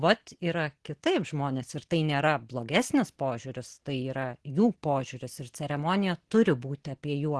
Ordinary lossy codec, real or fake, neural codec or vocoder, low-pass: Opus, 16 kbps; real; none; 10.8 kHz